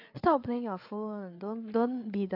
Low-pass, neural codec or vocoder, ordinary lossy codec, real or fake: 5.4 kHz; codec, 16 kHz, 8 kbps, FreqCodec, larger model; AAC, 32 kbps; fake